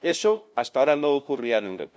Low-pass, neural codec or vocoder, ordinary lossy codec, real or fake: none; codec, 16 kHz, 0.5 kbps, FunCodec, trained on LibriTTS, 25 frames a second; none; fake